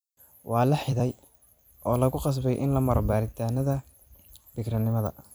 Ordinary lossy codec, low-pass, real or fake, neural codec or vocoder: none; none; fake; vocoder, 44.1 kHz, 128 mel bands every 256 samples, BigVGAN v2